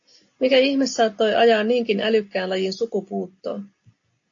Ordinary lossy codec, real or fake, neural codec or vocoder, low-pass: AAC, 48 kbps; real; none; 7.2 kHz